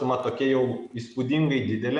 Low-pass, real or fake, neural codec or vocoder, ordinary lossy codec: 10.8 kHz; real; none; MP3, 96 kbps